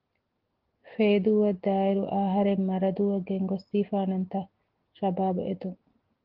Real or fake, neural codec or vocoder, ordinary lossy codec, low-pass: real; none; Opus, 16 kbps; 5.4 kHz